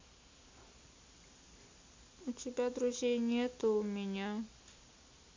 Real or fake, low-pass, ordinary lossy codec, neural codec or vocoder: real; 7.2 kHz; MP3, 48 kbps; none